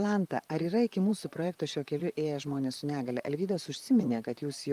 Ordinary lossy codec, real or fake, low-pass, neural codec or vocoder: Opus, 32 kbps; fake; 14.4 kHz; vocoder, 44.1 kHz, 128 mel bands, Pupu-Vocoder